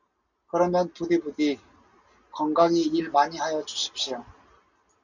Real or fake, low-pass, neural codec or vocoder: real; 7.2 kHz; none